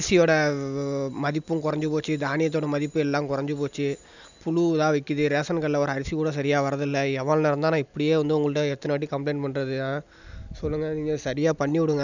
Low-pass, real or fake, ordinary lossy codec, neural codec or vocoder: 7.2 kHz; real; none; none